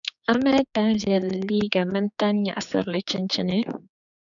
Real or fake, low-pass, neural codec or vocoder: fake; 7.2 kHz; codec, 16 kHz, 4 kbps, X-Codec, HuBERT features, trained on general audio